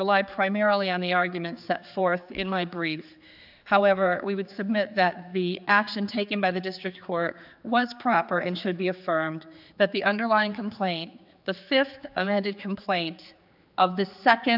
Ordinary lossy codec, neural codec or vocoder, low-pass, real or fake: AAC, 48 kbps; codec, 16 kHz, 4 kbps, X-Codec, HuBERT features, trained on general audio; 5.4 kHz; fake